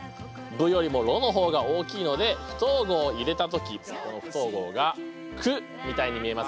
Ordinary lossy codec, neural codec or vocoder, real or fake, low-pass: none; none; real; none